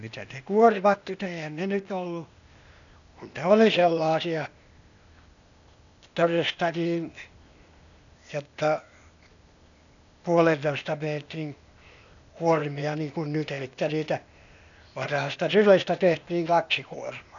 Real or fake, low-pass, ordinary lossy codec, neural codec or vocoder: fake; 7.2 kHz; none; codec, 16 kHz, 0.8 kbps, ZipCodec